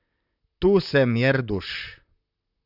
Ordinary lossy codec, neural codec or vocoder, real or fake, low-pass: none; vocoder, 44.1 kHz, 128 mel bands, Pupu-Vocoder; fake; 5.4 kHz